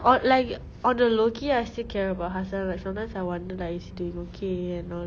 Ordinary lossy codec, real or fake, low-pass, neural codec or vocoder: none; real; none; none